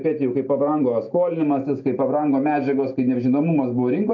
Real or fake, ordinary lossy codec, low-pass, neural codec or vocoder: real; AAC, 48 kbps; 7.2 kHz; none